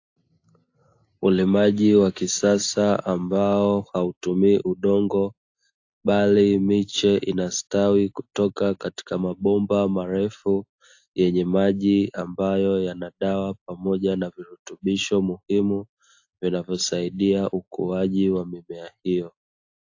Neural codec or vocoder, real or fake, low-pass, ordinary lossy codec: none; real; 7.2 kHz; AAC, 48 kbps